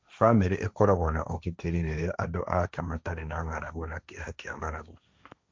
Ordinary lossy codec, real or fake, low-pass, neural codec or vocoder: none; fake; none; codec, 16 kHz, 1.1 kbps, Voila-Tokenizer